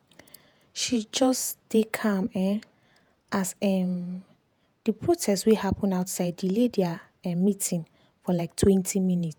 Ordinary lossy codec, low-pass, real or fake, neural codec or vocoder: none; none; fake; vocoder, 48 kHz, 128 mel bands, Vocos